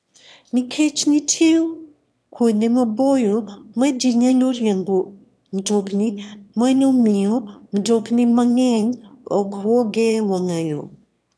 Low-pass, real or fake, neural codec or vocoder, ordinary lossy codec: none; fake; autoencoder, 22.05 kHz, a latent of 192 numbers a frame, VITS, trained on one speaker; none